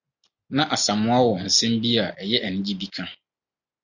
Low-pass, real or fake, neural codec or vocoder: 7.2 kHz; real; none